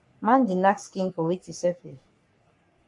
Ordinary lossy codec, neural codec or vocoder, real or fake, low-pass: MP3, 64 kbps; codec, 44.1 kHz, 3.4 kbps, Pupu-Codec; fake; 10.8 kHz